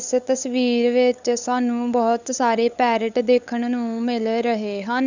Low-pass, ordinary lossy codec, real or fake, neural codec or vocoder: 7.2 kHz; none; fake; codec, 16 kHz, 8 kbps, FunCodec, trained on LibriTTS, 25 frames a second